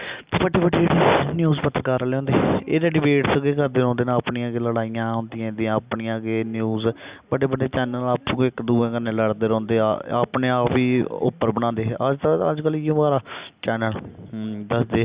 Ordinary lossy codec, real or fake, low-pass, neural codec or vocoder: Opus, 64 kbps; real; 3.6 kHz; none